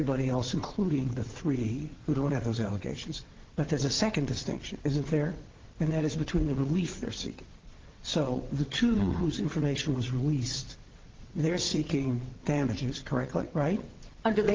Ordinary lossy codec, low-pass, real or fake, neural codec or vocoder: Opus, 16 kbps; 7.2 kHz; fake; vocoder, 22.05 kHz, 80 mel bands, WaveNeXt